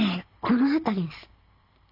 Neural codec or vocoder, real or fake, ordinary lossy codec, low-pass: none; real; none; 5.4 kHz